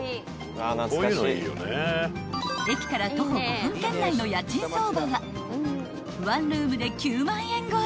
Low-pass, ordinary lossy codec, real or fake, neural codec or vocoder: none; none; real; none